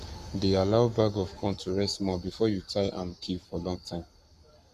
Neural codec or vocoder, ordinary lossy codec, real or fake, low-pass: codec, 44.1 kHz, 7.8 kbps, Pupu-Codec; Opus, 64 kbps; fake; 14.4 kHz